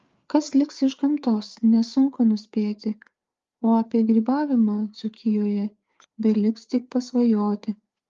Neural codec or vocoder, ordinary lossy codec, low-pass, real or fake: codec, 16 kHz, 4 kbps, FreqCodec, larger model; Opus, 32 kbps; 7.2 kHz; fake